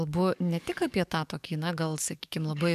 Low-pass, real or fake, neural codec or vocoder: 14.4 kHz; fake; codec, 44.1 kHz, 7.8 kbps, DAC